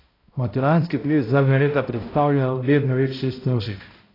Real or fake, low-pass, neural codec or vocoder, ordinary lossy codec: fake; 5.4 kHz; codec, 16 kHz, 0.5 kbps, X-Codec, HuBERT features, trained on balanced general audio; AAC, 24 kbps